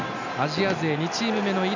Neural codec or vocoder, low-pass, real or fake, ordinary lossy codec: none; 7.2 kHz; real; none